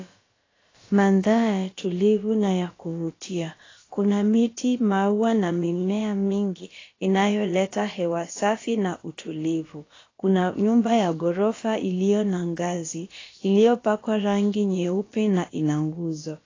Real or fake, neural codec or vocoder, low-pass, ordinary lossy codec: fake; codec, 16 kHz, about 1 kbps, DyCAST, with the encoder's durations; 7.2 kHz; AAC, 32 kbps